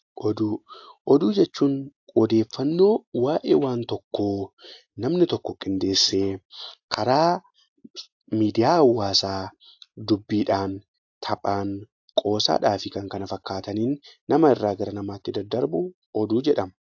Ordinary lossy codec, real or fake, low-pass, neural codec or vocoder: AAC, 48 kbps; real; 7.2 kHz; none